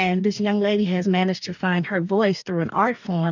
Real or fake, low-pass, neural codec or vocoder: fake; 7.2 kHz; codec, 44.1 kHz, 2.6 kbps, DAC